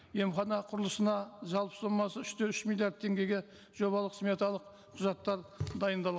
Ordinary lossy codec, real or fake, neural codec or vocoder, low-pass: none; real; none; none